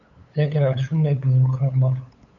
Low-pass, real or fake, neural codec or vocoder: 7.2 kHz; fake; codec, 16 kHz, 8 kbps, FunCodec, trained on LibriTTS, 25 frames a second